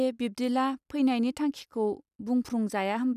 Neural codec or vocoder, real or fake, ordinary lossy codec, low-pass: none; real; none; 14.4 kHz